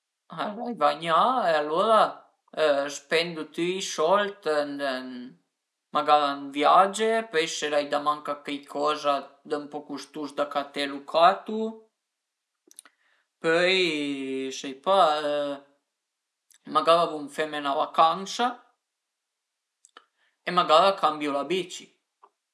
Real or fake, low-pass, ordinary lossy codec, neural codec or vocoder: real; none; none; none